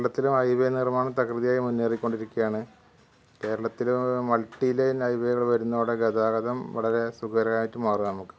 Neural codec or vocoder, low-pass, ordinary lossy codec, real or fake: none; none; none; real